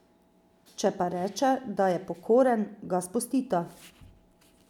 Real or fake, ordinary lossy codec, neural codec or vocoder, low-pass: real; none; none; 19.8 kHz